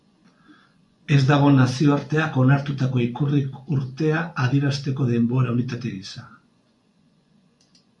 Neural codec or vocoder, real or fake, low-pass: vocoder, 24 kHz, 100 mel bands, Vocos; fake; 10.8 kHz